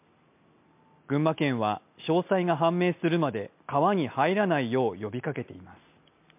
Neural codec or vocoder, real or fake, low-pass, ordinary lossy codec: none; real; 3.6 kHz; MP3, 32 kbps